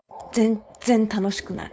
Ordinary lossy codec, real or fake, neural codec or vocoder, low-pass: none; fake; codec, 16 kHz, 4.8 kbps, FACodec; none